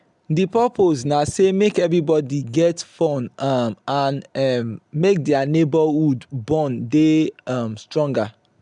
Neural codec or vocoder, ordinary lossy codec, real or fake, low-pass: none; none; real; 10.8 kHz